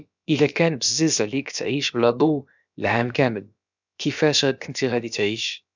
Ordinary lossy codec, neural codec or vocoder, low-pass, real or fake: none; codec, 16 kHz, about 1 kbps, DyCAST, with the encoder's durations; 7.2 kHz; fake